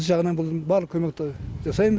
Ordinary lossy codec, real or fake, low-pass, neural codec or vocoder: none; real; none; none